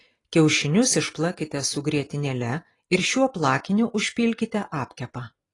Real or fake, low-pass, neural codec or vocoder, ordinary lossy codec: real; 10.8 kHz; none; AAC, 32 kbps